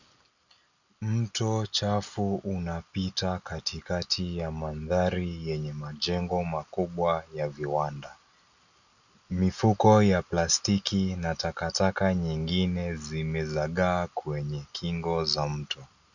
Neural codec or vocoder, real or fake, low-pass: none; real; 7.2 kHz